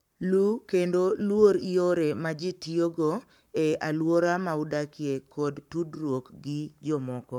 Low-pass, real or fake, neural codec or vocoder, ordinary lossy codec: 19.8 kHz; fake; codec, 44.1 kHz, 7.8 kbps, Pupu-Codec; none